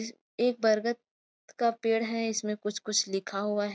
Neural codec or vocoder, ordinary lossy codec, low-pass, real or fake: none; none; none; real